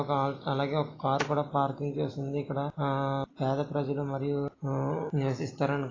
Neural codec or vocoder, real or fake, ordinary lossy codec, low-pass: none; real; AAC, 32 kbps; 7.2 kHz